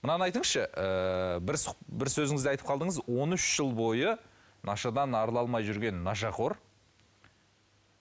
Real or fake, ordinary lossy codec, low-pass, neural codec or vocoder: real; none; none; none